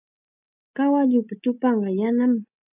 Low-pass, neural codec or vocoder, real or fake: 3.6 kHz; none; real